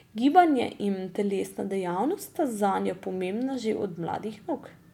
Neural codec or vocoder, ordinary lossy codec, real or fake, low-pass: none; none; real; 19.8 kHz